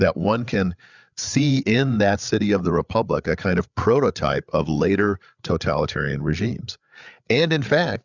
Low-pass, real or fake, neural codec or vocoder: 7.2 kHz; fake; codec, 16 kHz, 8 kbps, FreqCodec, larger model